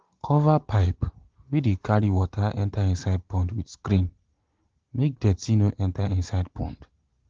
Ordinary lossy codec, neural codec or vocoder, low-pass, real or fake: Opus, 16 kbps; none; 7.2 kHz; real